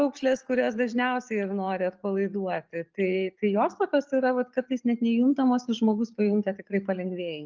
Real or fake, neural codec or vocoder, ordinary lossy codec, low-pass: real; none; Opus, 24 kbps; 7.2 kHz